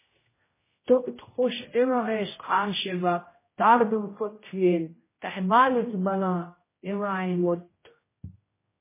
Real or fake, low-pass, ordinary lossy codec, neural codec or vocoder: fake; 3.6 kHz; MP3, 16 kbps; codec, 16 kHz, 0.5 kbps, X-Codec, HuBERT features, trained on general audio